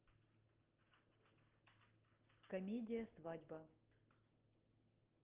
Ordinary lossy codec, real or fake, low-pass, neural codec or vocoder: Opus, 16 kbps; real; 3.6 kHz; none